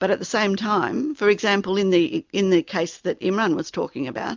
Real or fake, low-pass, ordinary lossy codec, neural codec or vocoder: real; 7.2 kHz; MP3, 64 kbps; none